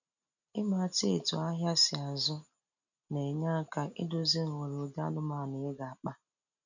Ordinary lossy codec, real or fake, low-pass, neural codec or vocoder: none; real; 7.2 kHz; none